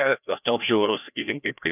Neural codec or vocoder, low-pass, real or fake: codec, 16 kHz, 1 kbps, FreqCodec, larger model; 3.6 kHz; fake